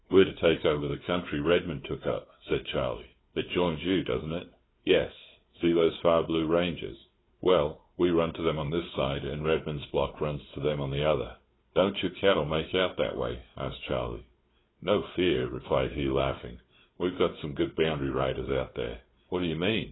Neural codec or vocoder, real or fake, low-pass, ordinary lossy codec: codec, 16 kHz, 4 kbps, FunCodec, trained on Chinese and English, 50 frames a second; fake; 7.2 kHz; AAC, 16 kbps